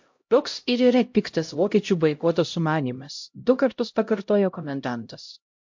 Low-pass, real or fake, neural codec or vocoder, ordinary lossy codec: 7.2 kHz; fake; codec, 16 kHz, 0.5 kbps, X-Codec, HuBERT features, trained on LibriSpeech; MP3, 48 kbps